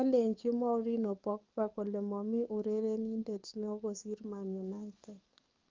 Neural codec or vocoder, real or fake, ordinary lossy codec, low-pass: codec, 44.1 kHz, 7.8 kbps, DAC; fake; Opus, 32 kbps; 7.2 kHz